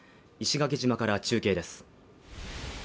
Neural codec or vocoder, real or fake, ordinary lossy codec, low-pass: none; real; none; none